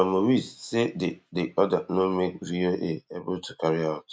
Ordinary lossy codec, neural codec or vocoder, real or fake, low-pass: none; none; real; none